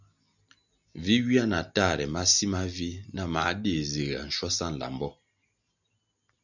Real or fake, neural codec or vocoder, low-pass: real; none; 7.2 kHz